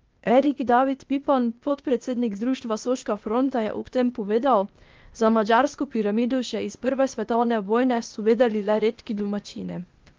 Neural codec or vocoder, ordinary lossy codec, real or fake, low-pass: codec, 16 kHz, 0.8 kbps, ZipCodec; Opus, 24 kbps; fake; 7.2 kHz